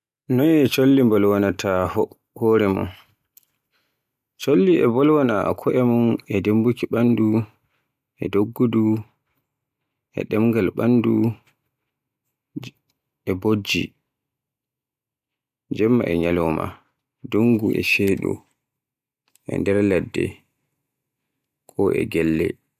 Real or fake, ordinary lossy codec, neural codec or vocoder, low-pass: real; none; none; 14.4 kHz